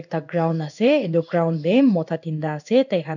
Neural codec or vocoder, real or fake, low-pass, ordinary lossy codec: codec, 16 kHz in and 24 kHz out, 1 kbps, XY-Tokenizer; fake; 7.2 kHz; none